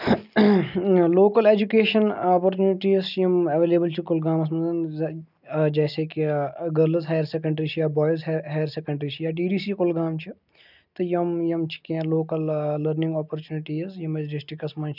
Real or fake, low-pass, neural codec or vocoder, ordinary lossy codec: real; 5.4 kHz; none; none